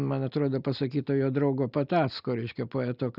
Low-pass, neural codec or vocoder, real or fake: 5.4 kHz; none; real